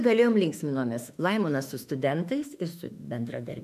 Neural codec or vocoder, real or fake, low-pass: autoencoder, 48 kHz, 32 numbers a frame, DAC-VAE, trained on Japanese speech; fake; 14.4 kHz